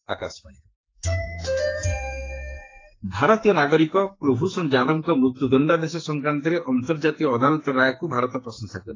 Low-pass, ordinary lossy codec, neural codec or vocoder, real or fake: 7.2 kHz; AAC, 32 kbps; codec, 44.1 kHz, 2.6 kbps, SNAC; fake